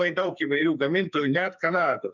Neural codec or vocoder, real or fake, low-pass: codec, 44.1 kHz, 2.6 kbps, SNAC; fake; 7.2 kHz